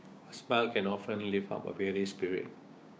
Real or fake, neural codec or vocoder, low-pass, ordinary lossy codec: fake; codec, 16 kHz, 6 kbps, DAC; none; none